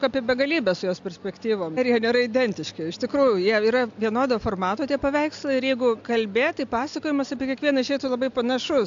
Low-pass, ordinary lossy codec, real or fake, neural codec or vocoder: 7.2 kHz; AAC, 64 kbps; real; none